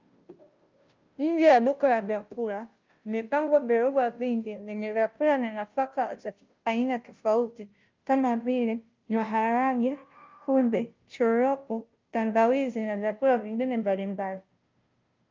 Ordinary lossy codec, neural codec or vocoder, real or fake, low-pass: Opus, 24 kbps; codec, 16 kHz, 0.5 kbps, FunCodec, trained on Chinese and English, 25 frames a second; fake; 7.2 kHz